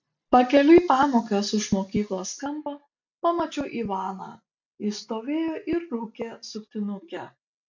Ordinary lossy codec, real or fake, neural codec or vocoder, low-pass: MP3, 64 kbps; real; none; 7.2 kHz